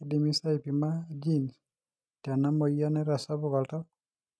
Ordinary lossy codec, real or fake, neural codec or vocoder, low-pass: none; real; none; none